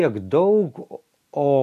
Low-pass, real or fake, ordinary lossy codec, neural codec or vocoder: 14.4 kHz; real; MP3, 96 kbps; none